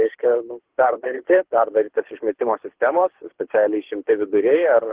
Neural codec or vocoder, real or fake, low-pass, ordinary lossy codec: codec, 24 kHz, 6 kbps, HILCodec; fake; 3.6 kHz; Opus, 16 kbps